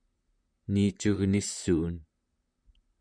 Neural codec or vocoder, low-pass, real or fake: vocoder, 44.1 kHz, 128 mel bands, Pupu-Vocoder; 9.9 kHz; fake